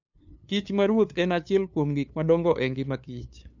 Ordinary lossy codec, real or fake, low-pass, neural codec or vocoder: none; fake; 7.2 kHz; codec, 16 kHz, 2 kbps, FunCodec, trained on LibriTTS, 25 frames a second